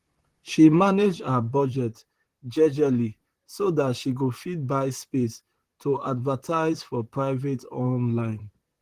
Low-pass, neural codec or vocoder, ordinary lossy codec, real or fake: 14.4 kHz; vocoder, 44.1 kHz, 128 mel bands, Pupu-Vocoder; Opus, 16 kbps; fake